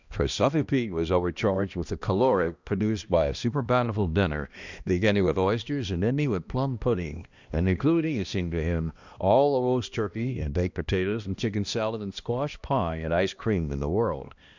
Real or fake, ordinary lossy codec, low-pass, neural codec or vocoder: fake; Opus, 64 kbps; 7.2 kHz; codec, 16 kHz, 1 kbps, X-Codec, HuBERT features, trained on balanced general audio